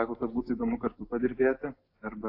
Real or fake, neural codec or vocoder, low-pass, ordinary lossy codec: real; none; 5.4 kHz; AAC, 32 kbps